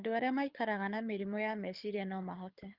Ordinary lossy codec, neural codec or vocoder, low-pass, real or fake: Opus, 24 kbps; codec, 24 kHz, 6 kbps, HILCodec; 5.4 kHz; fake